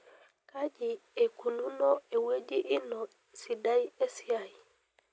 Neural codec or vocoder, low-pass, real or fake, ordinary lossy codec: none; none; real; none